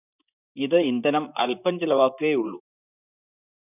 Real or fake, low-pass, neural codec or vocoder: fake; 3.6 kHz; vocoder, 44.1 kHz, 128 mel bands, Pupu-Vocoder